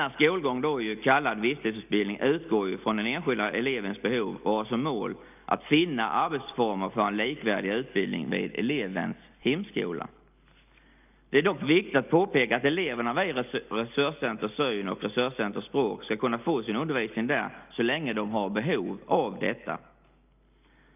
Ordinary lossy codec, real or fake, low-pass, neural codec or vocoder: none; real; 3.6 kHz; none